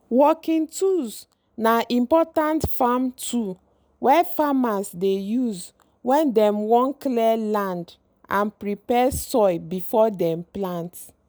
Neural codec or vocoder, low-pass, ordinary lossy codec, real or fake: none; none; none; real